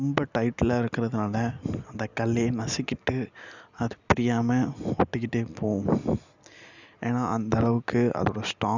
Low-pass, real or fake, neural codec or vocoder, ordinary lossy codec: 7.2 kHz; real; none; none